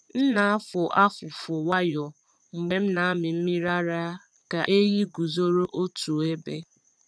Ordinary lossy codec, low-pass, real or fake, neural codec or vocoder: none; none; fake; vocoder, 22.05 kHz, 80 mel bands, Vocos